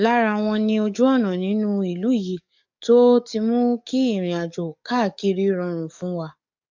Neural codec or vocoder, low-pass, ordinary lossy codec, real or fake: codec, 44.1 kHz, 7.8 kbps, DAC; 7.2 kHz; MP3, 64 kbps; fake